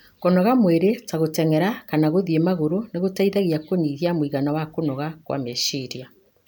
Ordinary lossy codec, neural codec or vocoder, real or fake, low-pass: none; none; real; none